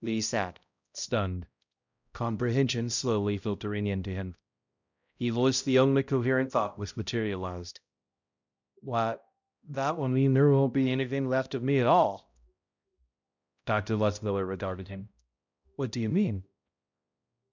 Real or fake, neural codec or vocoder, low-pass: fake; codec, 16 kHz, 0.5 kbps, X-Codec, HuBERT features, trained on balanced general audio; 7.2 kHz